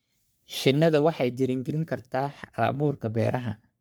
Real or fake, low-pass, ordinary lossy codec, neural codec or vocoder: fake; none; none; codec, 44.1 kHz, 3.4 kbps, Pupu-Codec